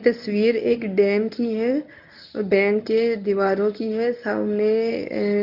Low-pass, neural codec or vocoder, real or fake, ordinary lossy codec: 5.4 kHz; codec, 24 kHz, 0.9 kbps, WavTokenizer, medium speech release version 1; fake; none